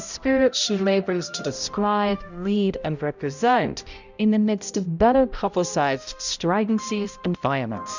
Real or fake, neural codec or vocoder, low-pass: fake; codec, 16 kHz, 0.5 kbps, X-Codec, HuBERT features, trained on balanced general audio; 7.2 kHz